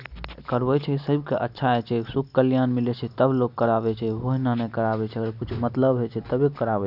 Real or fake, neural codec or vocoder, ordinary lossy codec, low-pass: real; none; none; 5.4 kHz